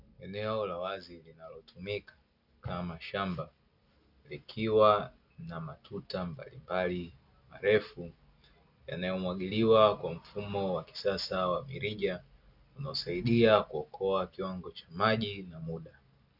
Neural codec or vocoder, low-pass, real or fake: none; 5.4 kHz; real